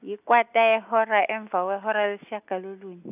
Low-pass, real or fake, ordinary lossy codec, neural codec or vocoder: 3.6 kHz; real; none; none